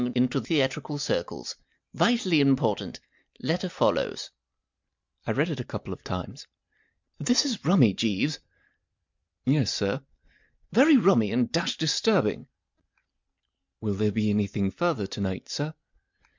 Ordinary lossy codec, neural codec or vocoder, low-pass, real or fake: MP3, 64 kbps; none; 7.2 kHz; real